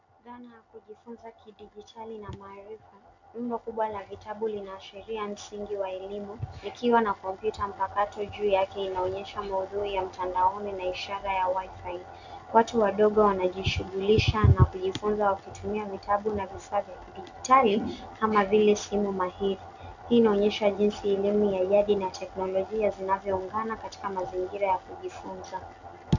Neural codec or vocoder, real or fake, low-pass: none; real; 7.2 kHz